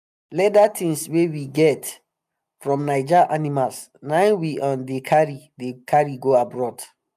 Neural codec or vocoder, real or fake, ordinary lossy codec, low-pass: none; real; none; 14.4 kHz